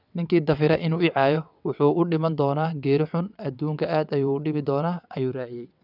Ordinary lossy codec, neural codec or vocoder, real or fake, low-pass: none; vocoder, 22.05 kHz, 80 mel bands, WaveNeXt; fake; 5.4 kHz